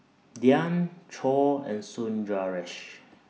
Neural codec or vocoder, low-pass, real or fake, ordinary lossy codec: none; none; real; none